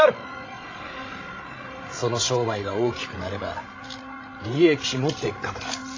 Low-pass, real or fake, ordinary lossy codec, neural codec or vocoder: 7.2 kHz; fake; AAC, 32 kbps; codec, 16 kHz, 16 kbps, FreqCodec, larger model